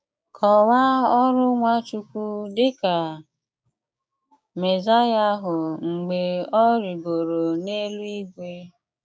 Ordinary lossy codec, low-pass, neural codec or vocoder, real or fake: none; none; codec, 16 kHz, 6 kbps, DAC; fake